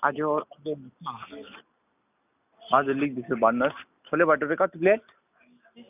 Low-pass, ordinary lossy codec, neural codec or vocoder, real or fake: 3.6 kHz; none; none; real